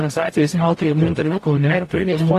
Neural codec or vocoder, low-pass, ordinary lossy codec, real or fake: codec, 44.1 kHz, 0.9 kbps, DAC; 14.4 kHz; AAC, 48 kbps; fake